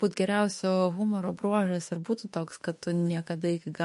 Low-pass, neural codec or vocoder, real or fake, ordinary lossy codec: 14.4 kHz; autoencoder, 48 kHz, 32 numbers a frame, DAC-VAE, trained on Japanese speech; fake; MP3, 48 kbps